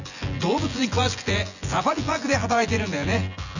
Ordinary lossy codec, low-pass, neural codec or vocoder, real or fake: none; 7.2 kHz; vocoder, 24 kHz, 100 mel bands, Vocos; fake